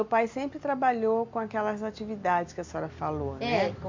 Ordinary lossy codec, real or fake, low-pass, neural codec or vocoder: MP3, 64 kbps; real; 7.2 kHz; none